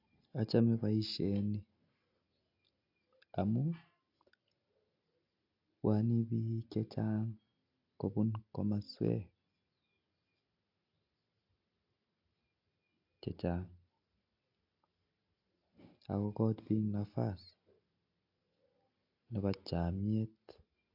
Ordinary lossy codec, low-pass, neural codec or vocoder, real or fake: AAC, 48 kbps; 5.4 kHz; none; real